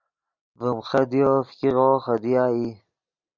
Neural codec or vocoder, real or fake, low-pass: none; real; 7.2 kHz